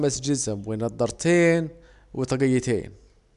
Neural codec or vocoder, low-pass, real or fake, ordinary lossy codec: none; 10.8 kHz; real; none